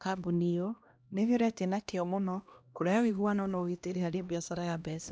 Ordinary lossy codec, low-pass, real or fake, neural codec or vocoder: none; none; fake; codec, 16 kHz, 1 kbps, X-Codec, HuBERT features, trained on LibriSpeech